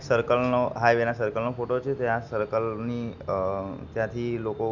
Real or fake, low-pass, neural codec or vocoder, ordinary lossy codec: real; 7.2 kHz; none; none